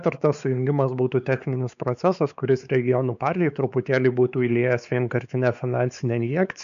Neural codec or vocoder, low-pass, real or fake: codec, 16 kHz, 4 kbps, X-Codec, WavLM features, trained on Multilingual LibriSpeech; 7.2 kHz; fake